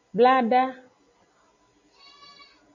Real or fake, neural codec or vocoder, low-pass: real; none; 7.2 kHz